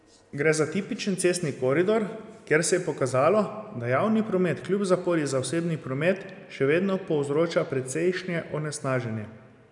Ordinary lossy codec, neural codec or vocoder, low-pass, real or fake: none; none; 10.8 kHz; real